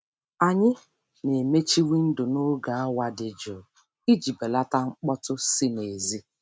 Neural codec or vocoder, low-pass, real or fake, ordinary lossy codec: none; none; real; none